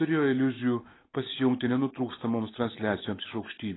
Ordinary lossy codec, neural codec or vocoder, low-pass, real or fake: AAC, 16 kbps; none; 7.2 kHz; real